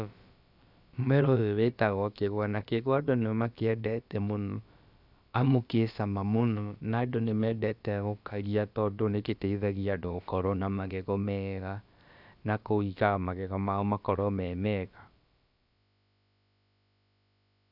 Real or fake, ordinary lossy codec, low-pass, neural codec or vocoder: fake; none; 5.4 kHz; codec, 16 kHz, about 1 kbps, DyCAST, with the encoder's durations